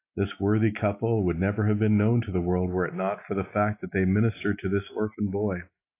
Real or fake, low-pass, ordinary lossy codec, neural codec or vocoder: real; 3.6 kHz; AAC, 24 kbps; none